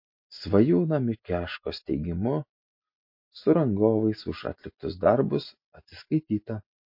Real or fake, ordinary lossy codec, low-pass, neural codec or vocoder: real; MP3, 32 kbps; 5.4 kHz; none